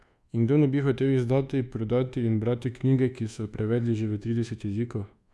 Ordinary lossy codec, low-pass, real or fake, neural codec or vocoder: none; none; fake; codec, 24 kHz, 1.2 kbps, DualCodec